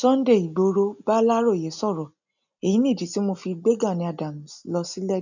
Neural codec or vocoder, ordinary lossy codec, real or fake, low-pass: none; none; real; 7.2 kHz